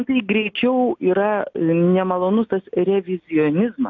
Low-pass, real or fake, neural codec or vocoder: 7.2 kHz; real; none